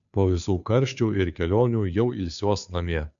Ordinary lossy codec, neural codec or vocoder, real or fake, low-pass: AAC, 64 kbps; codec, 16 kHz, 2 kbps, FunCodec, trained on Chinese and English, 25 frames a second; fake; 7.2 kHz